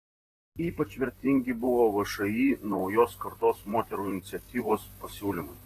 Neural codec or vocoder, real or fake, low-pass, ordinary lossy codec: vocoder, 44.1 kHz, 128 mel bands, Pupu-Vocoder; fake; 14.4 kHz; AAC, 48 kbps